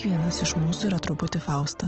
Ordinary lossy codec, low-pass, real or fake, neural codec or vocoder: Opus, 16 kbps; 7.2 kHz; real; none